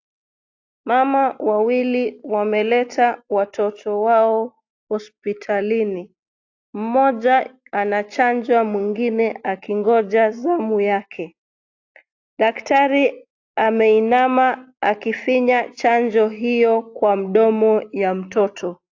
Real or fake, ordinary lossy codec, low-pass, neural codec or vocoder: real; AAC, 48 kbps; 7.2 kHz; none